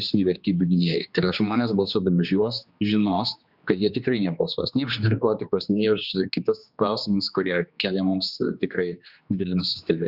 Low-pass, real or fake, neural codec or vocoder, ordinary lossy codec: 5.4 kHz; fake; codec, 16 kHz, 2 kbps, X-Codec, HuBERT features, trained on general audio; Opus, 64 kbps